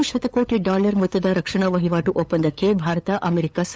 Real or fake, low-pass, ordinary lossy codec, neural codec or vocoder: fake; none; none; codec, 16 kHz, 8 kbps, FunCodec, trained on LibriTTS, 25 frames a second